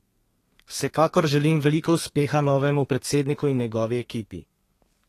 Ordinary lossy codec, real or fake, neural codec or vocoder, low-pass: AAC, 48 kbps; fake; codec, 32 kHz, 1.9 kbps, SNAC; 14.4 kHz